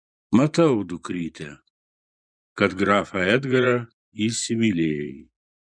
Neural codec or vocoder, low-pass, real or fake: vocoder, 24 kHz, 100 mel bands, Vocos; 9.9 kHz; fake